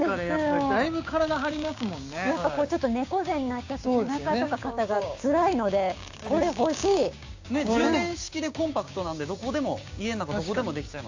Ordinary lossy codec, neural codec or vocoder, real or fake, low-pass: AAC, 48 kbps; codec, 16 kHz, 6 kbps, DAC; fake; 7.2 kHz